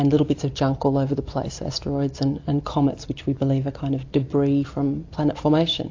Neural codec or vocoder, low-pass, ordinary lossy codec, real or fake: none; 7.2 kHz; AAC, 48 kbps; real